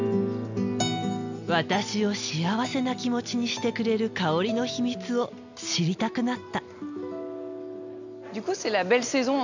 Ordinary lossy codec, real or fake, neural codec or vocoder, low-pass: none; real; none; 7.2 kHz